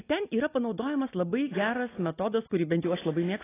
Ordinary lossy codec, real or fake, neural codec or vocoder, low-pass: AAC, 16 kbps; real; none; 3.6 kHz